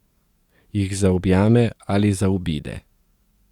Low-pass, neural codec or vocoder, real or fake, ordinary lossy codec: 19.8 kHz; codec, 44.1 kHz, 7.8 kbps, DAC; fake; Opus, 64 kbps